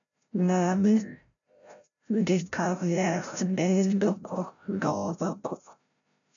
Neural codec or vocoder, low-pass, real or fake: codec, 16 kHz, 0.5 kbps, FreqCodec, larger model; 7.2 kHz; fake